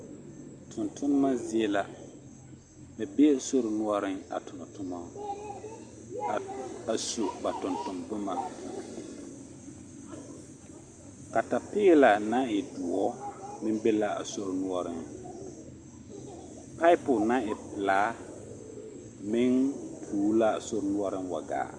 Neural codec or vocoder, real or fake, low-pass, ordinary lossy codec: none; real; 9.9 kHz; Opus, 64 kbps